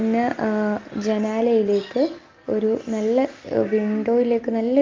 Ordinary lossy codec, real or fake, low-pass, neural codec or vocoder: Opus, 32 kbps; real; 7.2 kHz; none